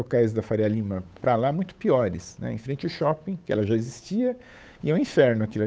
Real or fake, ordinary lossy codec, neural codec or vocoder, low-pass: fake; none; codec, 16 kHz, 8 kbps, FunCodec, trained on Chinese and English, 25 frames a second; none